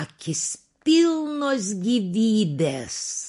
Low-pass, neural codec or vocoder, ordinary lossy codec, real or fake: 10.8 kHz; none; MP3, 48 kbps; real